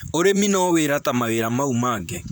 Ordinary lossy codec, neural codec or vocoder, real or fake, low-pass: none; vocoder, 44.1 kHz, 128 mel bands, Pupu-Vocoder; fake; none